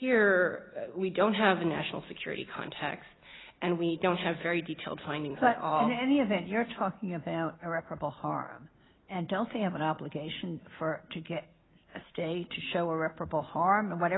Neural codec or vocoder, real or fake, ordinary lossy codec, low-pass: none; real; AAC, 16 kbps; 7.2 kHz